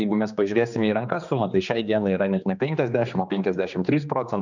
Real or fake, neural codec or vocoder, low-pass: fake; codec, 16 kHz, 2 kbps, X-Codec, HuBERT features, trained on general audio; 7.2 kHz